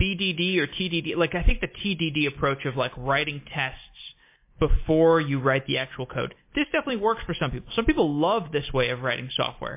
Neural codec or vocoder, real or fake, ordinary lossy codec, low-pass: none; real; MP3, 24 kbps; 3.6 kHz